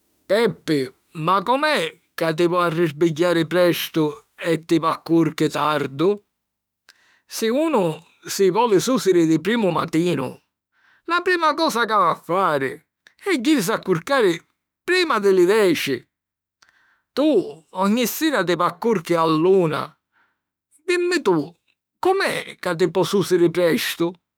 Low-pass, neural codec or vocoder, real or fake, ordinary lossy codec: none; autoencoder, 48 kHz, 32 numbers a frame, DAC-VAE, trained on Japanese speech; fake; none